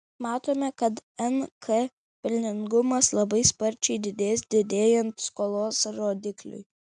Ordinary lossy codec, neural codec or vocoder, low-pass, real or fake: MP3, 96 kbps; none; 9.9 kHz; real